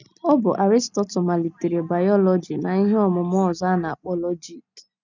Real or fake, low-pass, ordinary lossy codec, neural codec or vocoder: real; 7.2 kHz; none; none